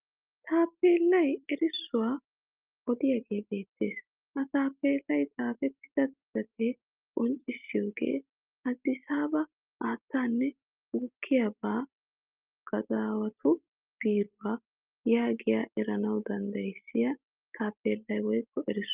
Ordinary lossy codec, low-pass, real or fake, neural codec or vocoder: Opus, 24 kbps; 3.6 kHz; real; none